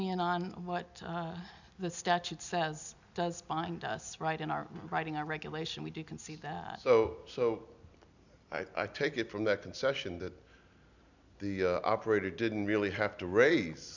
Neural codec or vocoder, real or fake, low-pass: none; real; 7.2 kHz